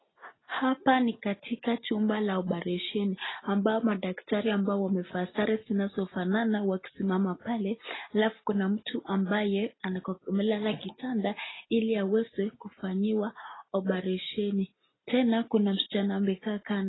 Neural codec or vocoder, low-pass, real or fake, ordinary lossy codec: none; 7.2 kHz; real; AAC, 16 kbps